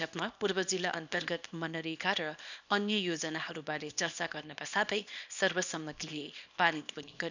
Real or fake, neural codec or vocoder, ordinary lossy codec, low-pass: fake; codec, 24 kHz, 0.9 kbps, WavTokenizer, small release; none; 7.2 kHz